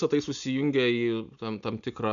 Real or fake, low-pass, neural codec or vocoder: real; 7.2 kHz; none